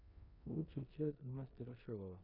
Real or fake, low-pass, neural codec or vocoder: fake; 5.4 kHz; codec, 16 kHz in and 24 kHz out, 0.9 kbps, LongCat-Audio-Codec, four codebook decoder